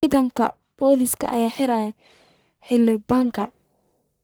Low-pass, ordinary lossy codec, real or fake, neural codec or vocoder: none; none; fake; codec, 44.1 kHz, 1.7 kbps, Pupu-Codec